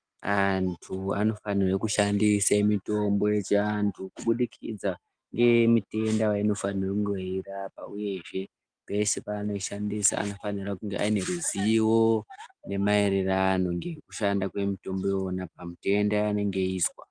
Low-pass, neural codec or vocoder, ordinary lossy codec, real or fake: 9.9 kHz; none; Opus, 32 kbps; real